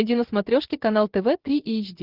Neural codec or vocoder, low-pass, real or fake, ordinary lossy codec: none; 5.4 kHz; real; Opus, 16 kbps